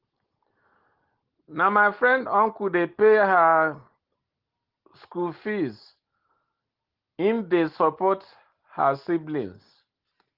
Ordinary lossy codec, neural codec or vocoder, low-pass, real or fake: Opus, 16 kbps; none; 5.4 kHz; real